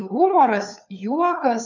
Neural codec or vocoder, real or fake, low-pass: codec, 16 kHz, 4 kbps, FunCodec, trained on LibriTTS, 50 frames a second; fake; 7.2 kHz